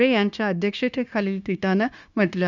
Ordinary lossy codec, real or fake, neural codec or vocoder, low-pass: none; fake; codec, 16 kHz, 0.9 kbps, LongCat-Audio-Codec; 7.2 kHz